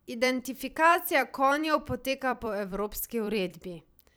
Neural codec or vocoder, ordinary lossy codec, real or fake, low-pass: vocoder, 44.1 kHz, 128 mel bands every 256 samples, BigVGAN v2; none; fake; none